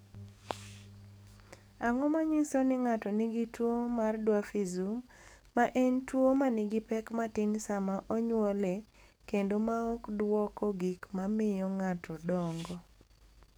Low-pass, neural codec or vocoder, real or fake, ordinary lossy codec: none; codec, 44.1 kHz, 7.8 kbps, DAC; fake; none